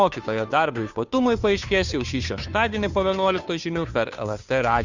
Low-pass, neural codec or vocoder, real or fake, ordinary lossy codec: 7.2 kHz; codec, 16 kHz, 2 kbps, FunCodec, trained on Chinese and English, 25 frames a second; fake; Opus, 64 kbps